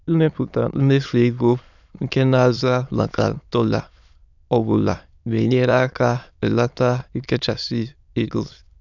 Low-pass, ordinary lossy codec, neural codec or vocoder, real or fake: 7.2 kHz; none; autoencoder, 22.05 kHz, a latent of 192 numbers a frame, VITS, trained on many speakers; fake